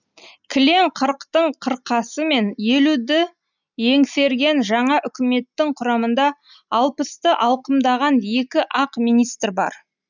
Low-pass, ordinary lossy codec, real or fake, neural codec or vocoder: 7.2 kHz; none; real; none